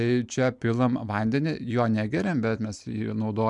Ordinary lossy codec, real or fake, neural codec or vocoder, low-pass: MP3, 96 kbps; real; none; 10.8 kHz